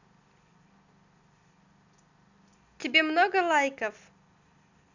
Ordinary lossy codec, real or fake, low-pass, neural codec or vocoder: none; real; 7.2 kHz; none